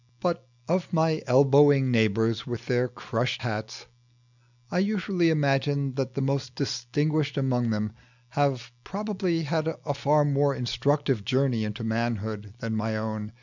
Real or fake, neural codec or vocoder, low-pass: real; none; 7.2 kHz